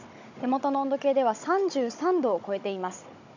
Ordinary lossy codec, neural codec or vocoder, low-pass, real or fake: none; codec, 16 kHz, 16 kbps, FunCodec, trained on Chinese and English, 50 frames a second; 7.2 kHz; fake